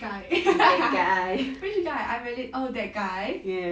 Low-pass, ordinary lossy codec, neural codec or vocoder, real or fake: none; none; none; real